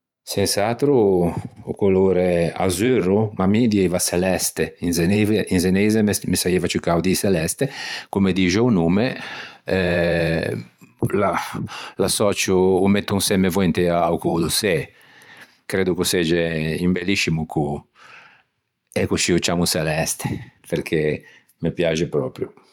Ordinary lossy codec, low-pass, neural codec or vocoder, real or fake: none; 19.8 kHz; vocoder, 44.1 kHz, 128 mel bands every 512 samples, BigVGAN v2; fake